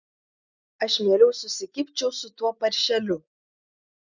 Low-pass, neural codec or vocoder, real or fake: 7.2 kHz; none; real